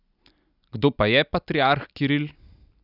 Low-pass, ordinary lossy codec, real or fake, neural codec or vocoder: 5.4 kHz; none; real; none